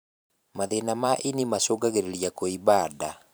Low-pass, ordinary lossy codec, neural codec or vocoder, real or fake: none; none; vocoder, 44.1 kHz, 128 mel bands every 512 samples, BigVGAN v2; fake